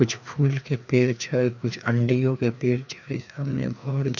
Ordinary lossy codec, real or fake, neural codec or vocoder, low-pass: none; fake; codec, 16 kHz, 2 kbps, FreqCodec, larger model; 7.2 kHz